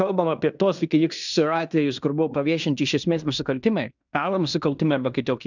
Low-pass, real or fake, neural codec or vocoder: 7.2 kHz; fake; codec, 16 kHz in and 24 kHz out, 0.9 kbps, LongCat-Audio-Codec, fine tuned four codebook decoder